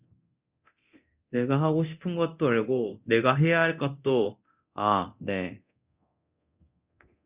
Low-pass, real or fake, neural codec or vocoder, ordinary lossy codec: 3.6 kHz; fake; codec, 24 kHz, 0.9 kbps, DualCodec; Opus, 64 kbps